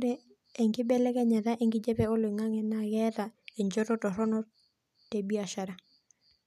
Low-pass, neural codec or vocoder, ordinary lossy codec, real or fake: 14.4 kHz; none; none; real